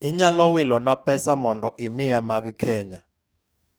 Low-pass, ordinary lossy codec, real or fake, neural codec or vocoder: none; none; fake; codec, 44.1 kHz, 2.6 kbps, DAC